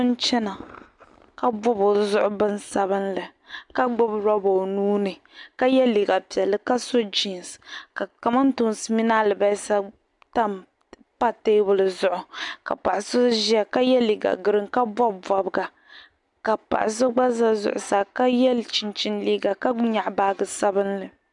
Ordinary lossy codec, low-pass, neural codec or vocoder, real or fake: MP3, 96 kbps; 10.8 kHz; none; real